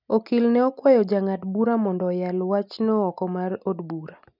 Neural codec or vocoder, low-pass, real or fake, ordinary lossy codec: none; 5.4 kHz; real; none